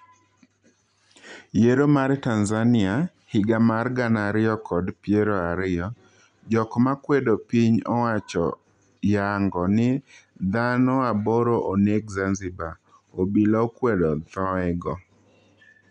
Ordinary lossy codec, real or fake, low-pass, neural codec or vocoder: none; real; 9.9 kHz; none